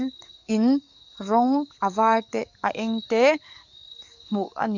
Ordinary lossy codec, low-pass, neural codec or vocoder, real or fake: none; 7.2 kHz; codec, 44.1 kHz, 7.8 kbps, DAC; fake